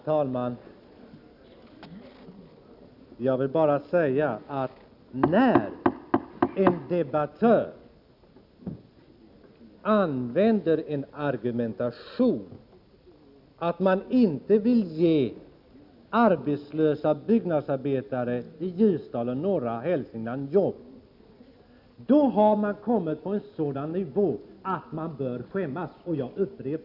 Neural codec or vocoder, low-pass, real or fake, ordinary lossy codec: none; 5.4 kHz; real; none